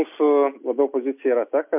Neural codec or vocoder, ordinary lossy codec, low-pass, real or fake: none; MP3, 32 kbps; 3.6 kHz; real